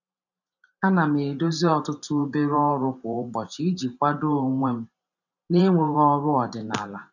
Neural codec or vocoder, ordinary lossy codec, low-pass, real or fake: vocoder, 44.1 kHz, 128 mel bands every 512 samples, BigVGAN v2; none; 7.2 kHz; fake